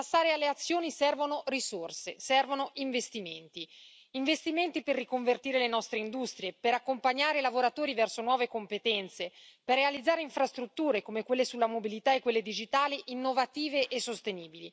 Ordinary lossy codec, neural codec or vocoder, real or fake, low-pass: none; none; real; none